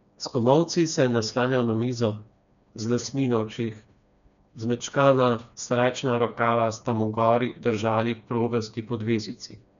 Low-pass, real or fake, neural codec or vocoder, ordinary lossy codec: 7.2 kHz; fake; codec, 16 kHz, 2 kbps, FreqCodec, smaller model; none